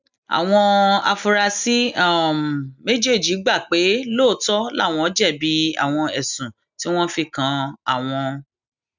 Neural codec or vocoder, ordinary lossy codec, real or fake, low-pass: none; none; real; 7.2 kHz